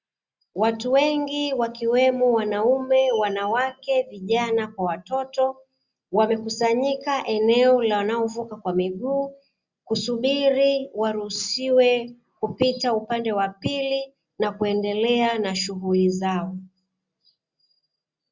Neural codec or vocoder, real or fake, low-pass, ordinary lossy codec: none; real; 7.2 kHz; Opus, 64 kbps